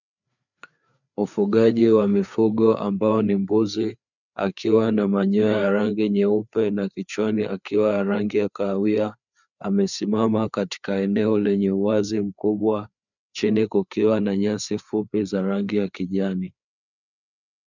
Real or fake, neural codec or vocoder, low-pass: fake; codec, 16 kHz, 4 kbps, FreqCodec, larger model; 7.2 kHz